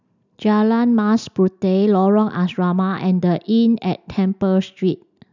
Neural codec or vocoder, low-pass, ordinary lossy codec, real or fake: none; 7.2 kHz; none; real